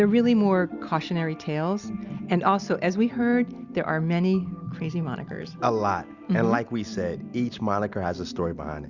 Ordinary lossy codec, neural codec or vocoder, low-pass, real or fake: Opus, 64 kbps; none; 7.2 kHz; real